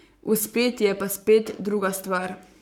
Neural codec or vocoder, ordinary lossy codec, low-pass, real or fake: codec, 44.1 kHz, 7.8 kbps, Pupu-Codec; none; 19.8 kHz; fake